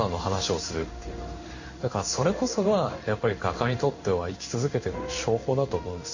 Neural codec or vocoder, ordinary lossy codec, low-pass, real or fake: codec, 16 kHz in and 24 kHz out, 1 kbps, XY-Tokenizer; Opus, 64 kbps; 7.2 kHz; fake